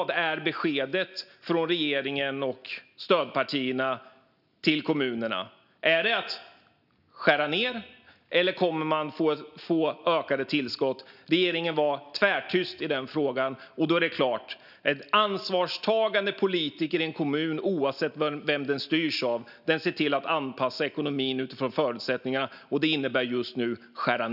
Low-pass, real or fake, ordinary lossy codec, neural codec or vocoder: 5.4 kHz; real; none; none